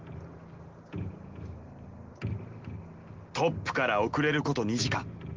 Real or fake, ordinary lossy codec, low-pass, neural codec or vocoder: real; Opus, 16 kbps; 7.2 kHz; none